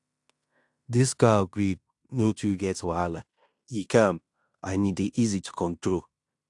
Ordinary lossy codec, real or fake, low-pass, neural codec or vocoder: AAC, 64 kbps; fake; 10.8 kHz; codec, 16 kHz in and 24 kHz out, 0.9 kbps, LongCat-Audio-Codec, fine tuned four codebook decoder